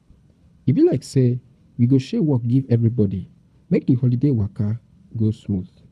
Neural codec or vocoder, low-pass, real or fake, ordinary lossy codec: codec, 24 kHz, 6 kbps, HILCodec; none; fake; none